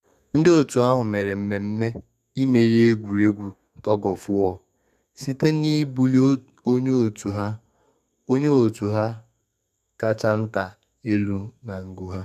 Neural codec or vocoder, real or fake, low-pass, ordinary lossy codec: codec, 32 kHz, 1.9 kbps, SNAC; fake; 14.4 kHz; none